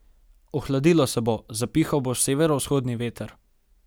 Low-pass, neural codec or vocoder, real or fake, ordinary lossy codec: none; none; real; none